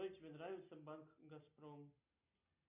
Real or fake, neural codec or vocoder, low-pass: real; none; 3.6 kHz